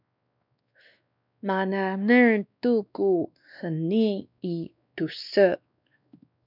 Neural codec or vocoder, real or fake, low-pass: codec, 16 kHz, 2 kbps, X-Codec, WavLM features, trained on Multilingual LibriSpeech; fake; 5.4 kHz